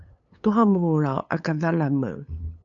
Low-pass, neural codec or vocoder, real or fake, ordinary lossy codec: 7.2 kHz; codec, 16 kHz, 8 kbps, FunCodec, trained on LibriTTS, 25 frames a second; fake; AAC, 64 kbps